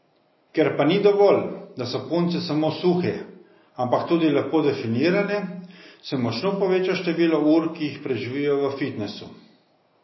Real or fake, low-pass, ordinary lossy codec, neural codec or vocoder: real; 7.2 kHz; MP3, 24 kbps; none